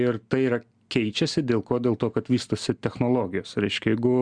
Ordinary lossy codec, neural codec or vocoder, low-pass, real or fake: Opus, 64 kbps; none; 9.9 kHz; real